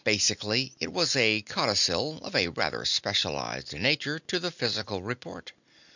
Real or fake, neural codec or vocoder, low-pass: real; none; 7.2 kHz